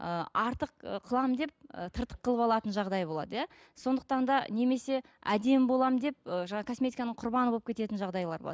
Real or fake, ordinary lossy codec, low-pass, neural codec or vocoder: real; none; none; none